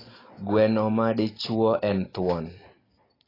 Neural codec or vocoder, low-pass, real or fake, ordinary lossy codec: none; 5.4 kHz; real; AAC, 24 kbps